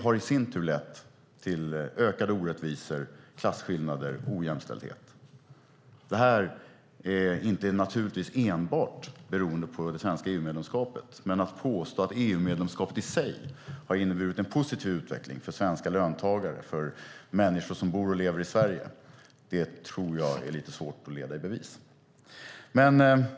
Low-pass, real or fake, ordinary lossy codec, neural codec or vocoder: none; real; none; none